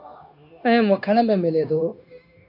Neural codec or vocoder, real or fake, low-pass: codec, 16 kHz, 0.9 kbps, LongCat-Audio-Codec; fake; 5.4 kHz